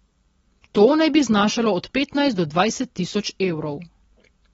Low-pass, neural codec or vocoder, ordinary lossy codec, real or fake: 19.8 kHz; none; AAC, 24 kbps; real